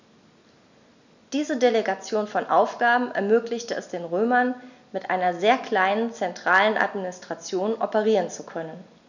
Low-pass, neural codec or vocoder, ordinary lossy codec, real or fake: 7.2 kHz; none; none; real